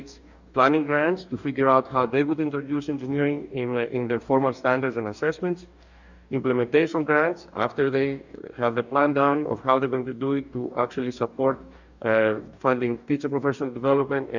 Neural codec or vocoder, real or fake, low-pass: codec, 44.1 kHz, 2.6 kbps, DAC; fake; 7.2 kHz